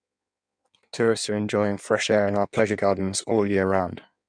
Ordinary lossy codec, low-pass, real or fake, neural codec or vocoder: none; 9.9 kHz; fake; codec, 16 kHz in and 24 kHz out, 1.1 kbps, FireRedTTS-2 codec